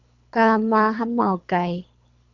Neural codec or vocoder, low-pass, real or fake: codec, 24 kHz, 3 kbps, HILCodec; 7.2 kHz; fake